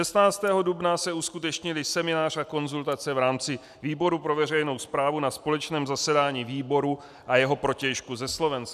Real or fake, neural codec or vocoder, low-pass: real; none; 14.4 kHz